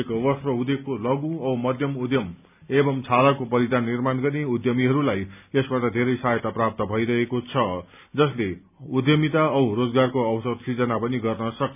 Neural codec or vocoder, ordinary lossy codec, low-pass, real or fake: none; none; 3.6 kHz; real